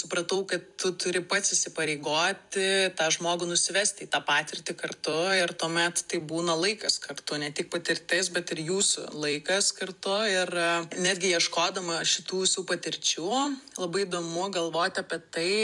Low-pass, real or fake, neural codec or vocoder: 10.8 kHz; fake; vocoder, 44.1 kHz, 128 mel bands every 256 samples, BigVGAN v2